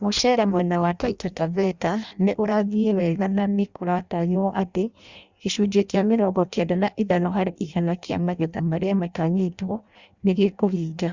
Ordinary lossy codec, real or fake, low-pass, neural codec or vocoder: Opus, 64 kbps; fake; 7.2 kHz; codec, 16 kHz in and 24 kHz out, 0.6 kbps, FireRedTTS-2 codec